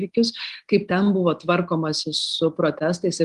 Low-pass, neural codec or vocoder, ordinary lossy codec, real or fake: 10.8 kHz; none; Opus, 24 kbps; real